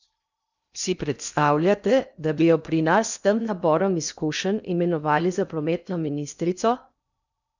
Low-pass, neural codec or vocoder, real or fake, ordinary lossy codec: 7.2 kHz; codec, 16 kHz in and 24 kHz out, 0.6 kbps, FocalCodec, streaming, 2048 codes; fake; none